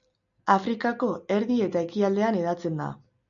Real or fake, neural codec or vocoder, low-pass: real; none; 7.2 kHz